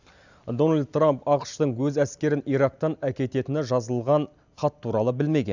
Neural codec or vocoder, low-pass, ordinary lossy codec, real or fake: none; 7.2 kHz; none; real